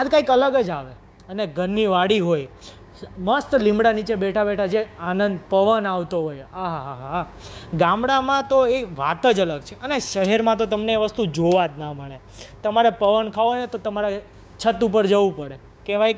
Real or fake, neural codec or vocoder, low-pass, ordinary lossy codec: fake; codec, 16 kHz, 6 kbps, DAC; none; none